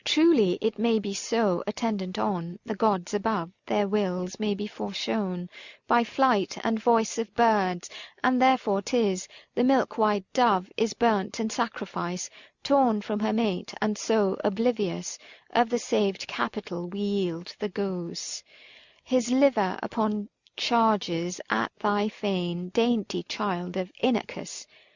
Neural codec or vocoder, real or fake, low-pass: none; real; 7.2 kHz